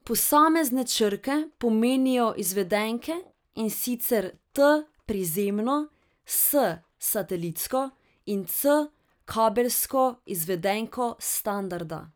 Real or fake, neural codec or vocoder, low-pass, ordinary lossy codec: real; none; none; none